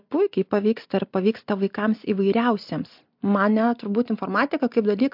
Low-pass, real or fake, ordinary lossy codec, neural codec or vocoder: 5.4 kHz; real; MP3, 48 kbps; none